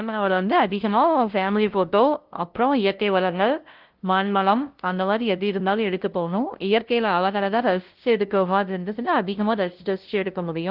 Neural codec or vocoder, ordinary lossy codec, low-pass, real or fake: codec, 16 kHz, 0.5 kbps, FunCodec, trained on LibriTTS, 25 frames a second; Opus, 16 kbps; 5.4 kHz; fake